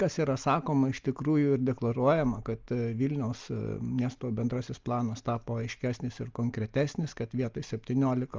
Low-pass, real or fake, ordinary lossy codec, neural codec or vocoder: 7.2 kHz; real; Opus, 24 kbps; none